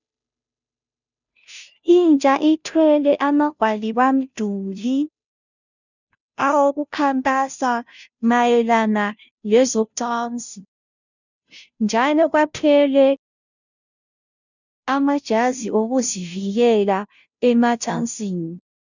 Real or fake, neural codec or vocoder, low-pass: fake; codec, 16 kHz, 0.5 kbps, FunCodec, trained on Chinese and English, 25 frames a second; 7.2 kHz